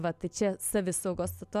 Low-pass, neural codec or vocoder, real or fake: 14.4 kHz; none; real